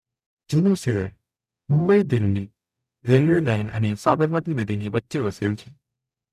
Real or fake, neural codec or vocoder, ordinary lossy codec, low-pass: fake; codec, 44.1 kHz, 0.9 kbps, DAC; none; 14.4 kHz